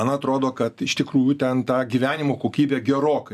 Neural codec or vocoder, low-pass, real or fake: none; 14.4 kHz; real